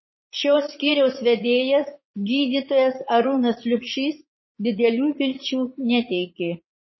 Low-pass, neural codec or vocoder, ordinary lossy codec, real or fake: 7.2 kHz; vocoder, 44.1 kHz, 80 mel bands, Vocos; MP3, 24 kbps; fake